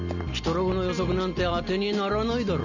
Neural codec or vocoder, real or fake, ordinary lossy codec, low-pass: none; real; none; 7.2 kHz